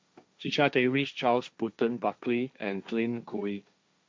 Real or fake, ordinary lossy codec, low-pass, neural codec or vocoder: fake; none; none; codec, 16 kHz, 1.1 kbps, Voila-Tokenizer